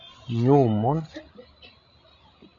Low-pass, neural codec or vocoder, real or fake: 7.2 kHz; codec, 16 kHz, 16 kbps, FreqCodec, larger model; fake